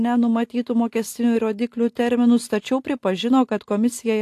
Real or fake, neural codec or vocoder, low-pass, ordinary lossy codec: real; none; 14.4 kHz; AAC, 64 kbps